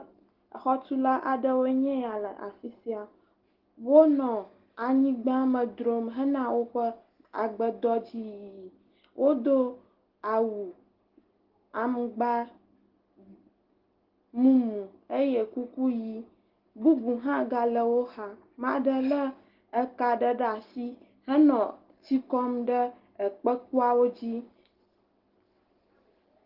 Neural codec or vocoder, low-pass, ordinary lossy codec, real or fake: none; 5.4 kHz; Opus, 16 kbps; real